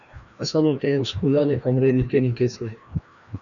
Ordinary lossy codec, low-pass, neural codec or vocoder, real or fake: MP3, 96 kbps; 7.2 kHz; codec, 16 kHz, 1 kbps, FreqCodec, larger model; fake